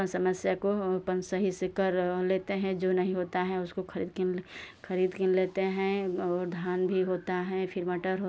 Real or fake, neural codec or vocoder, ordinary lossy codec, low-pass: real; none; none; none